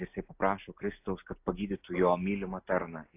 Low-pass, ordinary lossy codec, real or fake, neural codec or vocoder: 3.6 kHz; AAC, 24 kbps; real; none